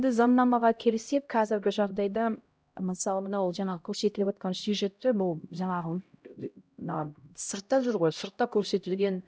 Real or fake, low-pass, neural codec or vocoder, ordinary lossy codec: fake; none; codec, 16 kHz, 0.5 kbps, X-Codec, HuBERT features, trained on LibriSpeech; none